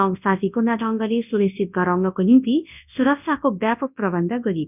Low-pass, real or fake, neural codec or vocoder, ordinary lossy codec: 3.6 kHz; fake; codec, 24 kHz, 0.9 kbps, WavTokenizer, large speech release; none